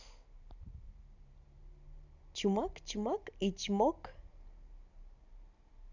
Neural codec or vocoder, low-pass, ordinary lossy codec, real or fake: none; 7.2 kHz; none; real